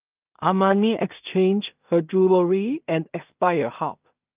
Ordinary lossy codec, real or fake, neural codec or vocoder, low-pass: Opus, 24 kbps; fake; codec, 16 kHz in and 24 kHz out, 0.4 kbps, LongCat-Audio-Codec, two codebook decoder; 3.6 kHz